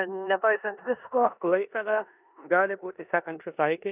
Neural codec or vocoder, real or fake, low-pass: codec, 16 kHz in and 24 kHz out, 0.9 kbps, LongCat-Audio-Codec, four codebook decoder; fake; 3.6 kHz